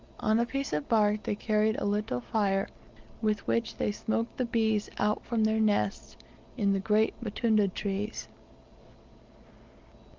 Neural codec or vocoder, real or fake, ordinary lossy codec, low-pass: none; real; Opus, 32 kbps; 7.2 kHz